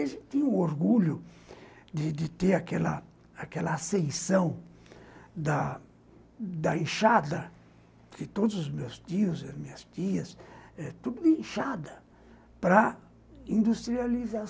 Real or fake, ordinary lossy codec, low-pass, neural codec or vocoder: real; none; none; none